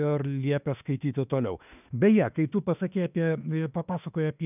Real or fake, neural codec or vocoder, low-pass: fake; autoencoder, 48 kHz, 32 numbers a frame, DAC-VAE, trained on Japanese speech; 3.6 kHz